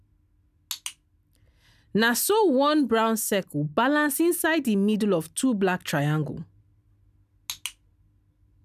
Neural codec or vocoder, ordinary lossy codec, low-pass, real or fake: none; none; 14.4 kHz; real